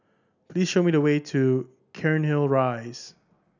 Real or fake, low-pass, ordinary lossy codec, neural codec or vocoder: real; 7.2 kHz; none; none